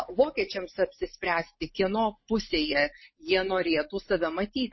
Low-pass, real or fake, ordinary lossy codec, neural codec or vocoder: 7.2 kHz; real; MP3, 24 kbps; none